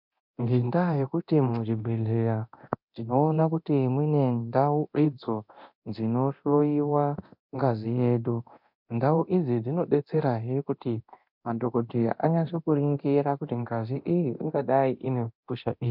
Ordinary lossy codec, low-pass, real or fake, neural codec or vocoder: MP3, 48 kbps; 5.4 kHz; fake; codec, 24 kHz, 0.9 kbps, DualCodec